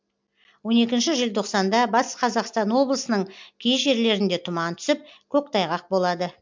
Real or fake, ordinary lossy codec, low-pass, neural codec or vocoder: real; MP3, 64 kbps; 7.2 kHz; none